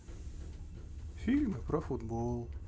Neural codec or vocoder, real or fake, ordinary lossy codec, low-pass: none; real; none; none